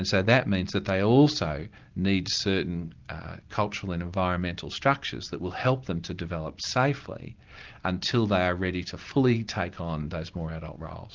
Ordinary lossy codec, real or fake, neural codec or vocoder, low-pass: Opus, 32 kbps; real; none; 7.2 kHz